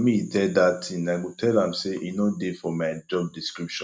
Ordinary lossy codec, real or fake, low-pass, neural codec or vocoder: none; real; none; none